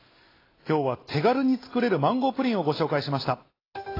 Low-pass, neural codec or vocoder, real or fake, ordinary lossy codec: 5.4 kHz; none; real; AAC, 24 kbps